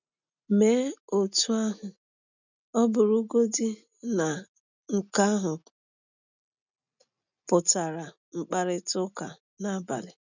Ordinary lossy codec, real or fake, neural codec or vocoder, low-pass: none; real; none; 7.2 kHz